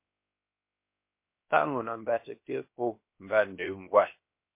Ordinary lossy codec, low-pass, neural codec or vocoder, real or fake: MP3, 24 kbps; 3.6 kHz; codec, 16 kHz, 0.7 kbps, FocalCodec; fake